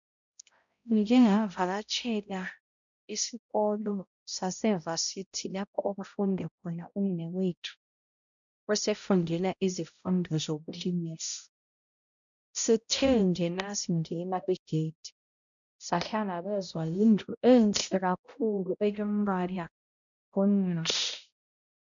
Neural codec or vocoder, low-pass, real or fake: codec, 16 kHz, 0.5 kbps, X-Codec, HuBERT features, trained on balanced general audio; 7.2 kHz; fake